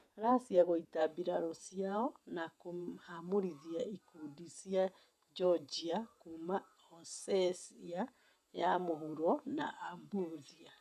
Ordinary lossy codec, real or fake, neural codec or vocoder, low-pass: none; fake; vocoder, 48 kHz, 128 mel bands, Vocos; 14.4 kHz